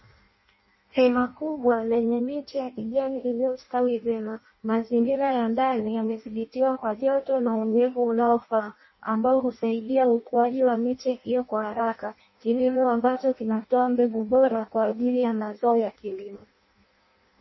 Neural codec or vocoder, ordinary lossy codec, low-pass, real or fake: codec, 16 kHz in and 24 kHz out, 0.6 kbps, FireRedTTS-2 codec; MP3, 24 kbps; 7.2 kHz; fake